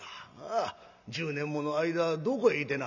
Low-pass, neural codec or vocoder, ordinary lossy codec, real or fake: 7.2 kHz; none; none; real